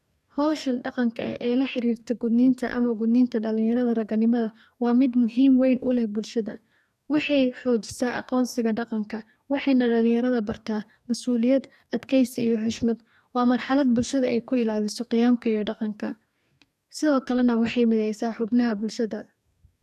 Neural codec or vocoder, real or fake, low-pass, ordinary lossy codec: codec, 44.1 kHz, 2.6 kbps, DAC; fake; 14.4 kHz; none